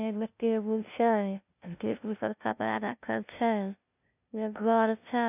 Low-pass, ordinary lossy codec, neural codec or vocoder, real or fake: 3.6 kHz; none; codec, 16 kHz, 0.5 kbps, FunCodec, trained on LibriTTS, 25 frames a second; fake